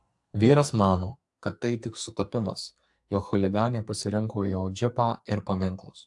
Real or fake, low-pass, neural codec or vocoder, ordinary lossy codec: fake; 10.8 kHz; codec, 44.1 kHz, 2.6 kbps, SNAC; AAC, 64 kbps